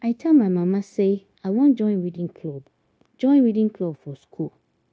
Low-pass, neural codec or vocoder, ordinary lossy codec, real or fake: none; codec, 16 kHz, 0.9 kbps, LongCat-Audio-Codec; none; fake